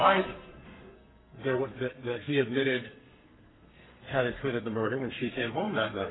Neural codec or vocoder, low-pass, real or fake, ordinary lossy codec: codec, 32 kHz, 1.9 kbps, SNAC; 7.2 kHz; fake; AAC, 16 kbps